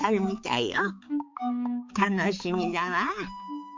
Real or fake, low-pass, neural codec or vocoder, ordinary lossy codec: fake; 7.2 kHz; codec, 16 kHz, 4 kbps, X-Codec, HuBERT features, trained on balanced general audio; MP3, 48 kbps